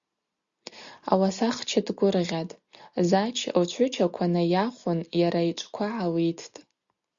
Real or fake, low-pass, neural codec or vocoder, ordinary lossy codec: real; 7.2 kHz; none; Opus, 64 kbps